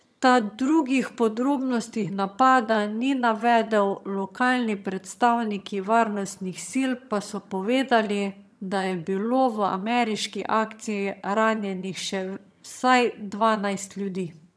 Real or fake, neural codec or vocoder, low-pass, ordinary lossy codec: fake; vocoder, 22.05 kHz, 80 mel bands, HiFi-GAN; none; none